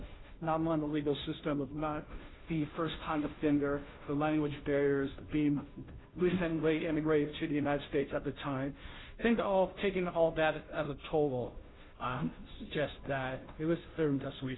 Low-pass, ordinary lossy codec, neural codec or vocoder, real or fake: 7.2 kHz; AAC, 16 kbps; codec, 16 kHz, 0.5 kbps, FunCodec, trained on Chinese and English, 25 frames a second; fake